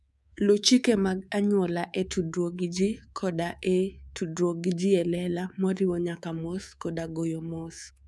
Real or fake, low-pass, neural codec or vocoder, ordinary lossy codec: fake; none; codec, 24 kHz, 3.1 kbps, DualCodec; none